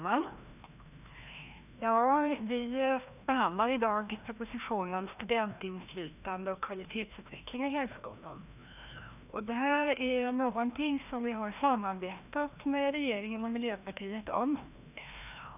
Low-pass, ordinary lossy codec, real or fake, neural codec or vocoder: 3.6 kHz; none; fake; codec, 16 kHz, 1 kbps, FreqCodec, larger model